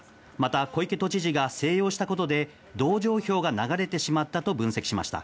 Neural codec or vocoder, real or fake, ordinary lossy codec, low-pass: none; real; none; none